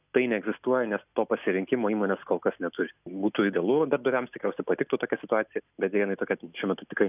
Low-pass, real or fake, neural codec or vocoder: 3.6 kHz; real; none